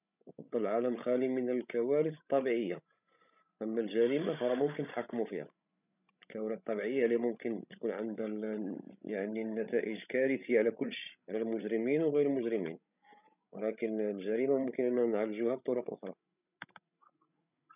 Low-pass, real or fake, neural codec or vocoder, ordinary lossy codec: 3.6 kHz; fake; codec, 16 kHz, 16 kbps, FreqCodec, larger model; none